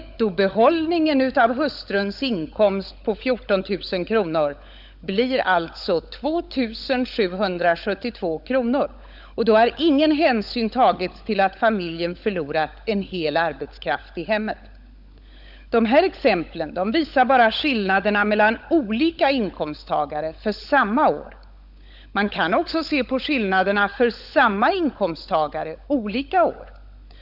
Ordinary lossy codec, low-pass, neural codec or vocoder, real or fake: Opus, 64 kbps; 5.4 kHz; codec, 16 kHz, 16 kbps, FunCodec, trained on LibriTTS, 50 frames a second; fake